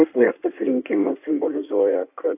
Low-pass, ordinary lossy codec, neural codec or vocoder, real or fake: 3.6 kHz; AAC, 32 kbps; codec, 16 kHz in and 24 kHz out, 1.1 kbps, FireRedTTS-2 codec; fake